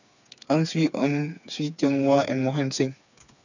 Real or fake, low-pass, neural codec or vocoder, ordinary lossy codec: fake; 7.2 kHz; codec, 16 kHz, 4 kbps, FreqCodec, smaller model; none